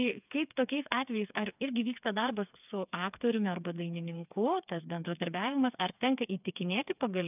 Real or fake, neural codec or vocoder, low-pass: fake; codec, 16 kHz, 4 kbps, FreqCodec, smaller model; 3.6 kHz